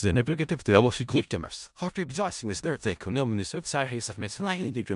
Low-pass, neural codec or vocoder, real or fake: 10.8 kHz; codec, 16 kHz in and 24 kHz out, 0.4 kbps, LongCat-Audio-Codec, four codebook decoder; fake